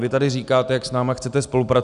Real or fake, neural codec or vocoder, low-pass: real; none; 10.8 kHz